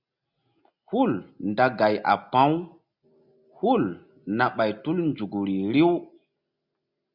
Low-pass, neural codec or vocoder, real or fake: 5.4 kHz; none; real